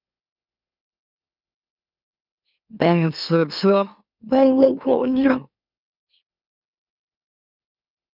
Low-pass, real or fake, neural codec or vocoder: 5.4 kHz; fake; autoencoder, 44.1 kHz, a latent of 192 numbers a frame, MeloTTS